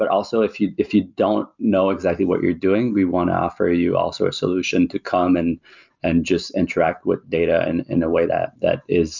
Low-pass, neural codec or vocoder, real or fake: 7.2 kHz; none; real